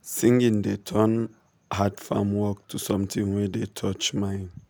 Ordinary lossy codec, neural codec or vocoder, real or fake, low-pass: none; none; real; none